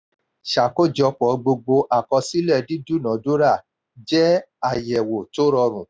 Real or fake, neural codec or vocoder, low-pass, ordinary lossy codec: real; none; none; none